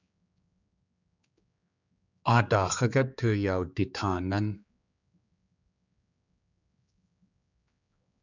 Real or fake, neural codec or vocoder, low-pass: fake; codec, 16 kHz, 4 kbps, X-Codec, HuBERT features, trained on general audio; 7.2 kHz